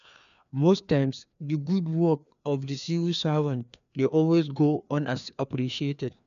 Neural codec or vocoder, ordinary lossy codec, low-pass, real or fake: codec, 16 kHz, 2 kbps, FreqCodec, larger model; none; 7.2 kHz; fake